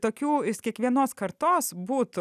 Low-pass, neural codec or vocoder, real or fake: 14.4 kHz; none; real